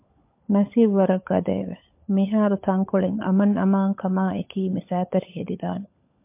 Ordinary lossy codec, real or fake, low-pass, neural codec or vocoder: MP3, 32 kbps; fake; 3.6 kHz; codec, 16 kHz, 16 kbps, FunCodec, trained on LibriTTS, 50 frames a second